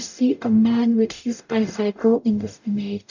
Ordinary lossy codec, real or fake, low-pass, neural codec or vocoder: none; fake; 7.2 kHz; codec, 44.1 kHz, 0.9 kbps, DAC